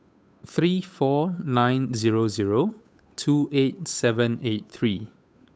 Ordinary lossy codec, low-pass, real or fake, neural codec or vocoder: none; none; fake; codec, 16 kHz, 8 kbps, FunCodec, trained on Chinese and English, 25 frames a second